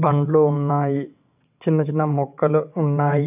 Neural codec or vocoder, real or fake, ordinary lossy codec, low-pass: vocoder, 22.05 kHz, 80 mel bands, WaveNeXt; fake; AAC, 32 kbps; 3.6 kHz